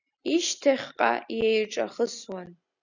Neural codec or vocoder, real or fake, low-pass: none; real; 7.2 kHz